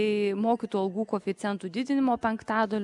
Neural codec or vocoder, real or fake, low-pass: none; real; 10.8 kHz